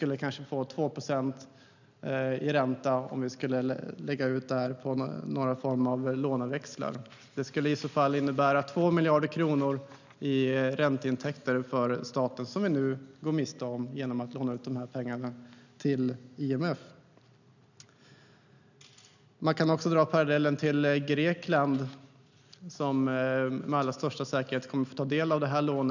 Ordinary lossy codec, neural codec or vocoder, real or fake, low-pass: none; none; real; 7.2 kHz